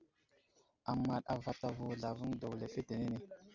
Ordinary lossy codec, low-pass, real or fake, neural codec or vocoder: Opus, 64 kbps; 7.2 kHz; real; none